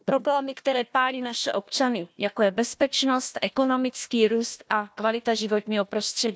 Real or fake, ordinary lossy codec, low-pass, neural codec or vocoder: fake; none; none; codec, 16 kHz, 1 kbps, FunCodec, trained on Chinese and English, 50 frames a second